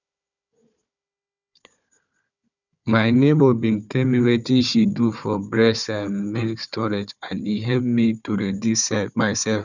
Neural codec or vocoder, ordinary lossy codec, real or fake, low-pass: codec, 16 kHz, 4 kbps, FunCodec, trained on Chinese and English, 50 frames a second; none; fake; 7.2 kHz